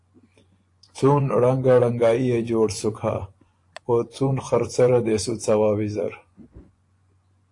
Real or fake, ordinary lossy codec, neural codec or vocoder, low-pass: fake; AAC, 48 kbps; vocoder, 24 kHz, 100 mel bands, Vocos; 10.8 kHz